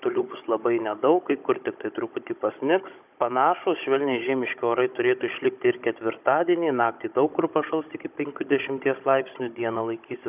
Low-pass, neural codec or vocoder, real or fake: 3.6 kHz; codec, 16 kHz, 16 kbps, FunCodec, trained on Chinese and English, 50 frames a second; fake